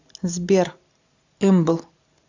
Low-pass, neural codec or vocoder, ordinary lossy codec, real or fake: 7.2 kHz; none; AAC, 48 kbps; real